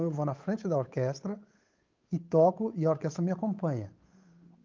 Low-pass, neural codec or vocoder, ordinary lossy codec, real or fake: 7.2 kHz; codec, 16 kHz, 8 kbps, FunCodec, trained on Chinese and English, 25 frames a second; Opus, 24 kbps; fake